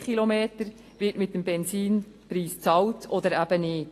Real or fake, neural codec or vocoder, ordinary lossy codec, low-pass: real; none; AAC, 48 kbps; 14.4 kHz